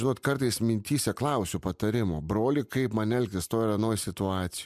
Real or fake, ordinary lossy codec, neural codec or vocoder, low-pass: real; MP3, 96 kbps; none; 14.4 kHz